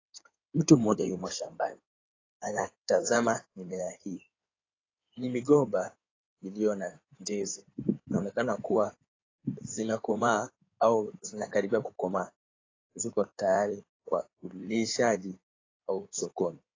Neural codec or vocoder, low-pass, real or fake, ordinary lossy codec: codec, 16 kHz in and 24 kHz out, 2.2 kbps, FireRedTTS-2 codec; 7.2 kHz; fake; AAC, 32 kbps